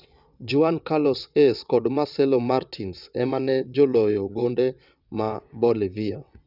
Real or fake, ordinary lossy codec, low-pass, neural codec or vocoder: fake; none; 5.4 kHz; vocoder, 22.05 kHz, 80 mel bands, WaveNeXt